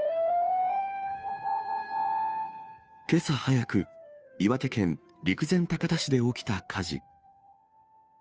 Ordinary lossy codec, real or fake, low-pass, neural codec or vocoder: none; fake; none; codec, 16 kHz, 2 kbps, FunCodec, trained on Chinese and English, 25 frames a second